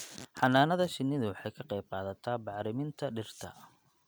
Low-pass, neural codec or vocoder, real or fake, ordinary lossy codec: none; none; real; none